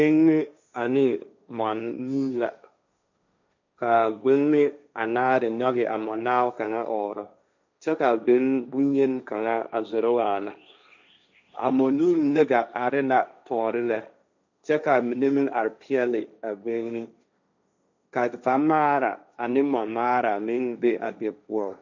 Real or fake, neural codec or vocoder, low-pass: fake; codec, 16 kHz, 1.1 kbps, Voila-Tokenizer; 7.2 kHz